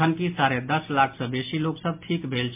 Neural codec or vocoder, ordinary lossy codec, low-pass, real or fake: none; MP3, 32 kbps; 3.6 kHz; real